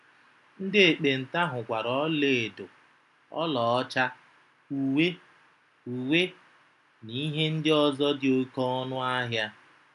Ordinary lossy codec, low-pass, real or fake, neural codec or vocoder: none; 10.8 kHz; real; none